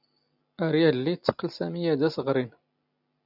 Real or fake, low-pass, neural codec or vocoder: real; 5.4 kHz; none